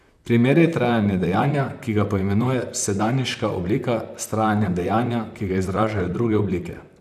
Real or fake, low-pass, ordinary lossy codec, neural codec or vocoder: fake; 14.4 kHz; none; vocoder, 44.1 kHz, 128 mel bands, Pupu-Vocoder